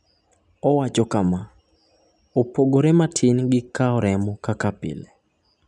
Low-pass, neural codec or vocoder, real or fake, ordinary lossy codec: 10.8 kHz; none; real; none